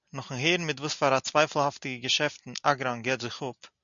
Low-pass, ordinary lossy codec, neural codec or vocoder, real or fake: 7.2 kHz; MP3, 96 kbps; none; real